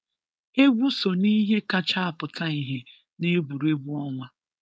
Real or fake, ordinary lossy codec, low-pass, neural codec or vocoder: fake; none; none; codec, 16 kHz, 4.8 kbps, FACodec